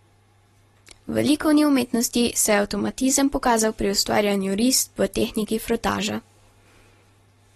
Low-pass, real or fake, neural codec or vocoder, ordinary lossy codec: 19.8 kHz; real; none; AAC, 32 kbps